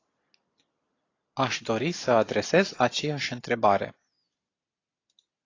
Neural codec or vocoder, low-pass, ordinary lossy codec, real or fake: none; 7.2 kHz; AAC, 32 kbps; real